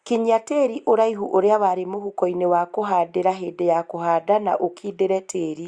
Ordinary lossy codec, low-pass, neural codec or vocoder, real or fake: Opus, 64 kbps; 9.9 kHz; none; real